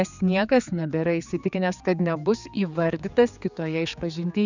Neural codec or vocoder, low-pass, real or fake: codec, 16 kHz, 4 kbps, X-Codec, HuBERT features, trained on general audio; 7.2 kHz; fake